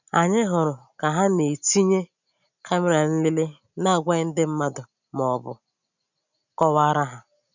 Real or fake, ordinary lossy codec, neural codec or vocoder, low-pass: real; none; none; 7.2 kHz